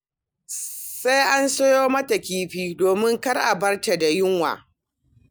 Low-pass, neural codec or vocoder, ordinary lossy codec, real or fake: none; none; none; real